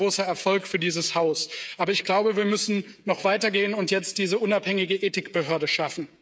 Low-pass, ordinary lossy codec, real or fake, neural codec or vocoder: none; none; fake; codec, 16 kHz, 16 kbps, FreqCodec, smaller model